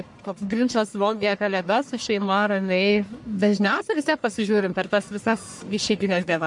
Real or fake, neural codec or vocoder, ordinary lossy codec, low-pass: fake; codec, 44.1 kHz, 1.7 kbps, Pupu-Codec; MP3, 64 kbps; 10.8 kHz